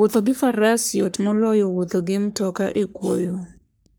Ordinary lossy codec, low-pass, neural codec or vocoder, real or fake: none; none; codec, 44.1 kHz, 3.4 kbps, Pupu-Codec; fake